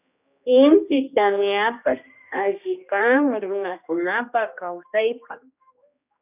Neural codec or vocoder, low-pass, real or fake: codec, 16 kHz, 1 kbps, X-Codec, HuBERT features, trained on general audio; 3.6 kHz; fake